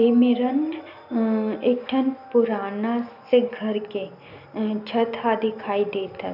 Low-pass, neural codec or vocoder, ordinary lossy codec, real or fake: 5.4 kHz; none; none; real